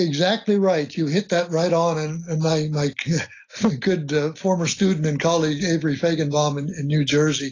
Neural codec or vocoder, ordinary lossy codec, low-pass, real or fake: none; AAC, 32 kbps; 7.2 kHz; real